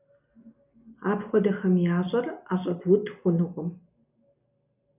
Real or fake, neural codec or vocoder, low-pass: real; none; 3.6 kHz